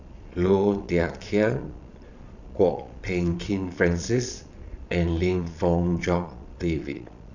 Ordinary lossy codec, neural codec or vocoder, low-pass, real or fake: none; vocoder, 22.05 kHz, 80 mel bands, Vocos; 7.2 kHz; fake